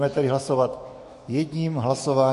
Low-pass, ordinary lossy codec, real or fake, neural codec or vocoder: 14.4 kHz; MP3, 48 kbps; fake; autoencoder, 48 kHz, 128 numbers a frame, DAC-VAE, trained on Japanese speech